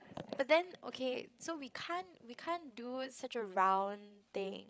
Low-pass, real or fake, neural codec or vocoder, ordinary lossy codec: none; fake; codec, 16 kHz, 16 kbps, FreqCodec, larger model; none